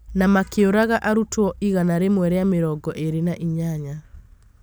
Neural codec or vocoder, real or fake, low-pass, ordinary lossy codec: none; real; none; none